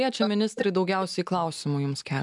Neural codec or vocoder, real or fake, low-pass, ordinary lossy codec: none; real; 10.8 kHz; MP3, 96 kbps